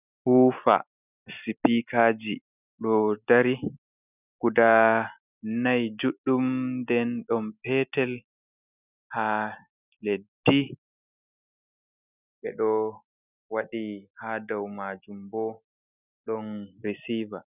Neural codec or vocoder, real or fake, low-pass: none; real; 3.6 kHz